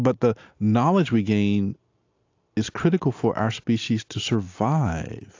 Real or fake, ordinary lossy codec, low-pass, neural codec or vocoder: real; AAC, 48 kbps; 7.2 kHz; none